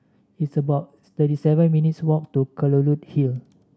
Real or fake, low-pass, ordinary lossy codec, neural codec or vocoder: real; none; none; none